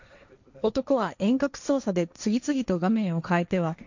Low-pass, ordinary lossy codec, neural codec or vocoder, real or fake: 7.2 kHz; none; codec, 16 kHz, 1.1 kbps, Voila-Tokenizer; fake